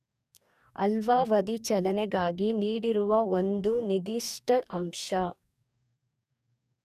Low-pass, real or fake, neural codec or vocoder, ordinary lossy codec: 14.4 kHz; fake; codec, 44.1 kHz, 2.6 kbps, DAC; none